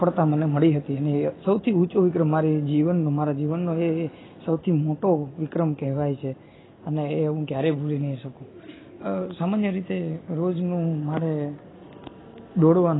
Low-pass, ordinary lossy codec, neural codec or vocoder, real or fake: 7.2 kHz; AAC, 16 kbps; none; real